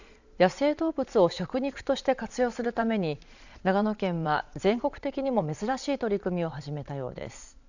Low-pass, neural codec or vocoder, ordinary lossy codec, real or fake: 7.2 kHz; vocoder, 22.05 kHz, 80 mel bands, Vocos; none; fake